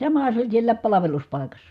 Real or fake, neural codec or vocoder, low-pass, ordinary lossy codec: real; none; 14.4 kHz; none